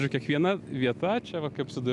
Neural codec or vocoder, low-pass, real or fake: none; 10.8 kHz; real